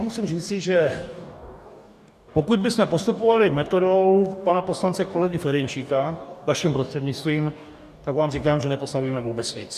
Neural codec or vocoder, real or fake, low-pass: codec, 44.1 kHz, 2.6 kbps, DAC; fake; 14.4 kHz